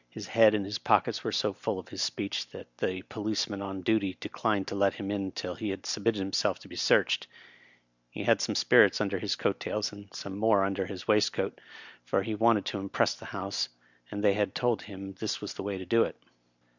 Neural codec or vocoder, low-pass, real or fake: none; 7.2 kHz; real